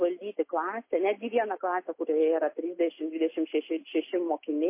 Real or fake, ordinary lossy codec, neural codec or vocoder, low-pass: real; MP3, 24 kbps; none; 3.6 kHz